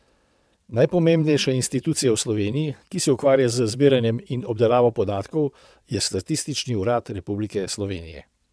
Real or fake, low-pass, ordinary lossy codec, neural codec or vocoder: fake; none; none; vocoder, 22.05 kHz, 80 mel bands, WaveNeXt